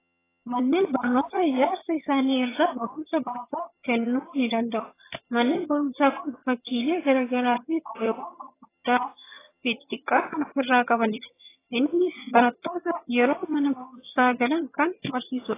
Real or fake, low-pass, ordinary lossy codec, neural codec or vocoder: fake; 3.6 kHz; AAC, 16 kbps; vocoder, 22.05 kHz, 80 mel bands, HiFi-GAN